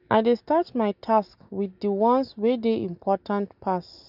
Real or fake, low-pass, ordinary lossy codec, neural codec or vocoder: real; 5.4 kHz; none; none